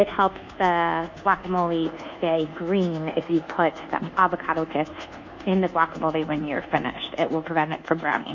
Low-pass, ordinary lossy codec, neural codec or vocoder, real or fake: 7.2 kHz; MP3, 64 kbps; codec, 24 kHz, 1.2 kbps, DualCodec; fake